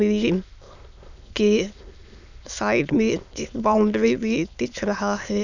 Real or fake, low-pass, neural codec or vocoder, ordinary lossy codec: fake; 7.2 kHz; autoencoder, 22.05 kHz, a latent of 192 numbers a frame, VITS, trained on many speakers; none